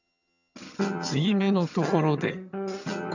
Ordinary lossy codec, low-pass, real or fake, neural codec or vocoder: none; 7.2 kHz; fake; vocoder, 22.05 kHz, 80 mel bands, HiFi-GAN